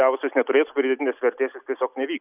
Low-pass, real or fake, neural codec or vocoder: 3.6 kHz; real; none